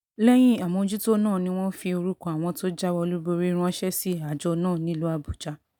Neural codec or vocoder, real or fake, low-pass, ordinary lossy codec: none; real; 19.8 kHz; none